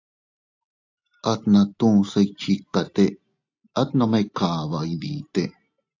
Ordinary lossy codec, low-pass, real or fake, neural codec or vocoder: AAC, 48 kbps; 7.2 kHz; real; none